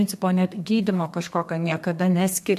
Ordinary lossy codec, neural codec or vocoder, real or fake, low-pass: MP3, 64 kbps; codec, 32 kHz, 1.9 kbps, SNAC; fake; 14.4 kHz